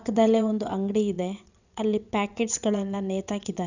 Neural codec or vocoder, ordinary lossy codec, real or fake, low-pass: vocoder, 22.05 kHz, 80 mel bands, WaveNeXt; none; fake; 7.2 kHz